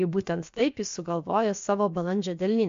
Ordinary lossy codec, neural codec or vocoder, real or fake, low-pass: MP3, 48 kbps; codec, 16 kHz, about 1 kbps, DyCAST, with the encoder's durations; fake; 7.2 kHz